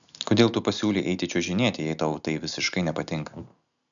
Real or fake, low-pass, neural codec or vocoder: real; 7.2 kHz; none